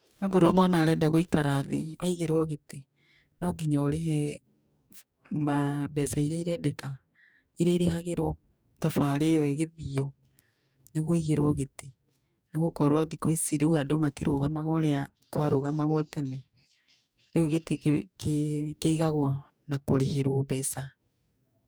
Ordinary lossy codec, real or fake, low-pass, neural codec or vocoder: none; fake; none; codec, 44.1 kHz, 2.6 kbps, DAC